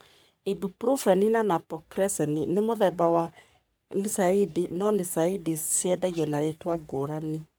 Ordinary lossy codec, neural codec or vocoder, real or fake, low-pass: none; codec, 44.1 kHz, 3.4 kbps, Pupu-Codec; fake; none